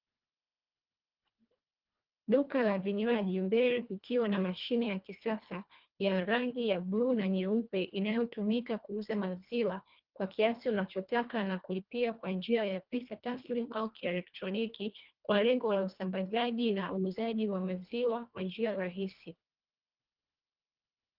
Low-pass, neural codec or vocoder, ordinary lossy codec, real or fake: 5.4 kHz; codec, 24 kHz, 1.5 kbps, HILCodec; Opus, 32 kbps; fake